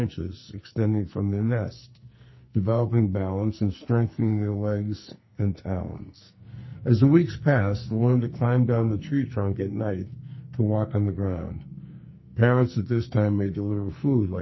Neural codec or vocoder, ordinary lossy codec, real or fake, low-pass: codec, 44.1 kHz, 2.6 kbps, SNAC; MP3, 24 kbps; fake; 7.2 kHz